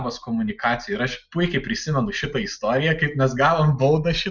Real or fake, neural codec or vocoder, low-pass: real; none; 7.2 kHz